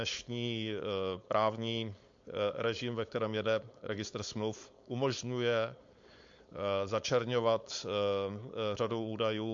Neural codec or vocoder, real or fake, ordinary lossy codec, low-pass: codec, 16 kHz, 4.8 kbps, FACodec; fake; MP3, 48 kbps; 7.2 kHz